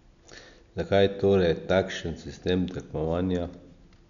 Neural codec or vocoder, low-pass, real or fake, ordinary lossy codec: none; 7.2 kHz; real; none